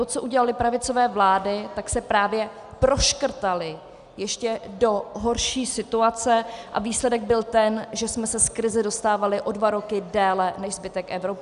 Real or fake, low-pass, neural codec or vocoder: real; 10.8 kHz; none